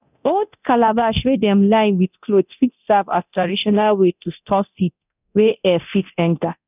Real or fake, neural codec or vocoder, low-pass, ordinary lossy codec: fake; codec, 24 kHz, 0.9 kbps, DualCodec; 3.6 kHz; none